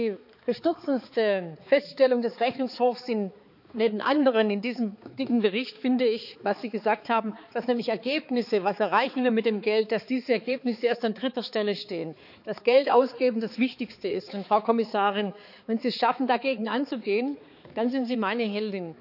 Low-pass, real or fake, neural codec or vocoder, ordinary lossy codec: 5.4 kHz; fake; codec, 16 kHz, 4 kbps, X-Codec, HuBERT features, trained on balanced general audio; none